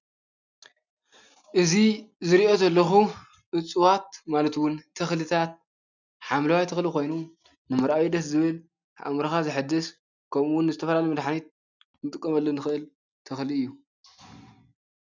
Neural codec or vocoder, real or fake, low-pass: none; real; 7.2 kHz